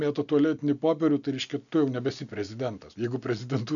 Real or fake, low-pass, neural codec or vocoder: real; 7.2 kHz; none